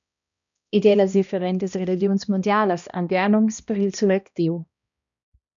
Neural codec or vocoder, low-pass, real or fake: codec, 16 kHz, 1 kbps, X-Codec, HuBERT features, trained on balanced general audio; 7.2 kHz; fake